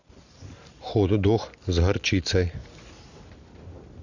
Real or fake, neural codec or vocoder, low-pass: real; none; 7.2 kHz